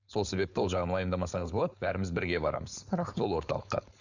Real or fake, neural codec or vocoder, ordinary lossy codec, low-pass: fake; codec, 16 kHz, 4.8 kbps, FACodec; none; 7.2 kHz